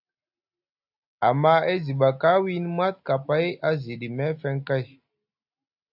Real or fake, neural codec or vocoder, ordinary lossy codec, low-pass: real; none; AAC, 48 kbps; 5.4 kHz